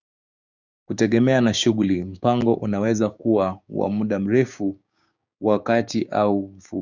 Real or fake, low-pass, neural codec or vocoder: fake; 7.2 kHz; codec, 16 kHz, 6 kbps, DAC